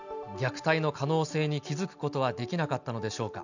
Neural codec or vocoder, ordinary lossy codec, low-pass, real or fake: none; none; 7.2 kHz; real